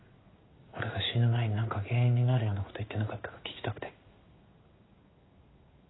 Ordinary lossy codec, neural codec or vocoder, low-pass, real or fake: AAC, 16 kbps; codec, 16 kHz in and 24 kHz out, 1 kbps, XY-Tokenizer; 7.2 kHz; fake